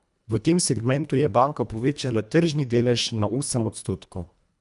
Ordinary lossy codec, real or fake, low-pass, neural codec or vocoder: none; fake; 10.8 kHz; codec, 24 kHz, 1.5 kbps, HILCodec